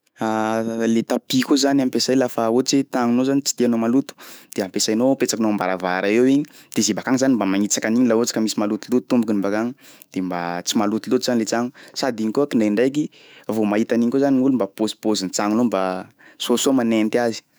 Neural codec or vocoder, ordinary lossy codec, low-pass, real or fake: autoencoder, 48 kHz, 128 numbers a frame, DAC-VAE, trained on Japanese speech; none; none; fake